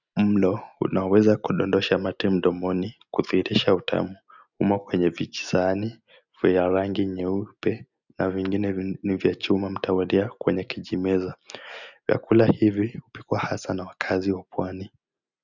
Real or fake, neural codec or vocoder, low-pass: real; none; 7.2 kHz